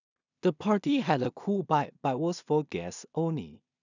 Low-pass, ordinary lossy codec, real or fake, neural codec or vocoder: 7.2 kHz; none; fake; codec, 16 kHz in and 24 kHz out, 0.4 kbps, LongCat-Audio-Codec, two codebook decoder